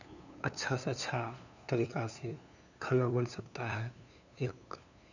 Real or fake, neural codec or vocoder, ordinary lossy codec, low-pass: fake; codec, 16 kHz, 2 kbps, FunCodec, trained on LibriTTS, 25 frames a second; none; 7.2 kHz